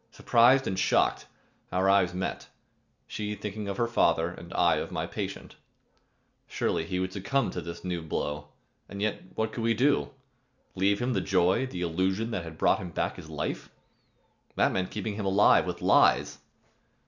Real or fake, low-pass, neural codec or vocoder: real; 7.2 kHz; none